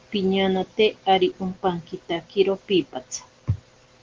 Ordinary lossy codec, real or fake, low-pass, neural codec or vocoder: Opus, 16 kbps; real; 7.2 kHz; none